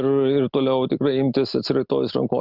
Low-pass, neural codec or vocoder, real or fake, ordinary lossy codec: 5.4 kHz; none; real; Opus, 64 kbps